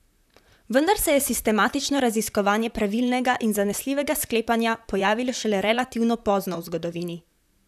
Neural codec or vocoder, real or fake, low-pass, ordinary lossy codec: vocoder, 44.1 kHz, 128 mel bands, Pupu-Vocoder; fake; 14.4 kHz; none